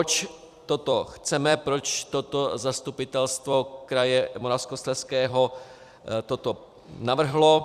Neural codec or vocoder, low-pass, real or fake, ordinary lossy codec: vocoder, 44.1 kHz, 128 mel bands every 256 samples, BigVGAN v2; 14.4 kHz; fake; Opus, 64 kbps